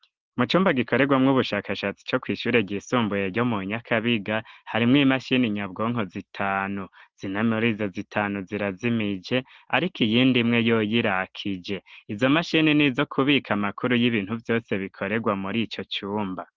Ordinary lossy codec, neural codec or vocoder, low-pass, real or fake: Opus, 16 kbps; none; 7.2 kHz; real